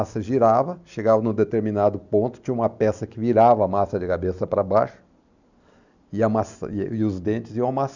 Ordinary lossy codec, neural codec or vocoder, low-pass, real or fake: none; none; 7.2 kHz; real